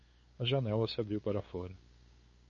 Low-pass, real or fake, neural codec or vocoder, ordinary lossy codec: 7.2 kHz; fake; codec, 16 kHz, 8 kbps, FunCodec, trained on LibriTTS, 25 frames a second; MP3, 32 kbps